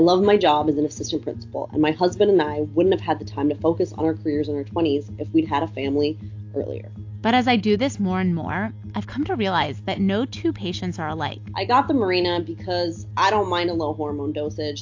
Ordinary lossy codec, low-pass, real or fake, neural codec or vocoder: AAC, 48 kbps; 7.2 kHz; real; none